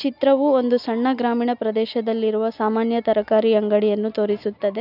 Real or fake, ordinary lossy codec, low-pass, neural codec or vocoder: real; none; 5.4 kHz; none